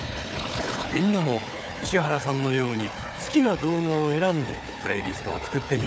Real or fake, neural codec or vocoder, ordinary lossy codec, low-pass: fake; codec, 16 kHz, 4 kbps, FunCodec, trained on Chinese and English, 50 frames a second; none; none